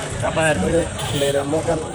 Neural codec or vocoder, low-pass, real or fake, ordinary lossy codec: vocoder, 44.1 kHz, 128 mel bands, Pupu-Vocoder; none; fake; none